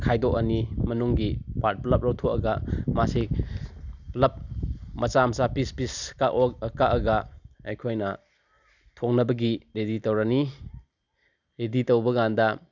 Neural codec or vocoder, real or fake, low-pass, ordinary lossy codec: none; real; 7.2 kHz; none